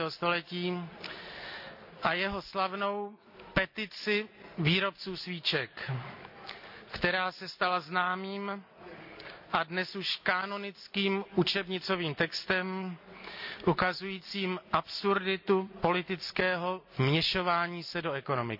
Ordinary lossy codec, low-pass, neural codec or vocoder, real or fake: AAC, 48 kbps; 5.4 kHz; none; real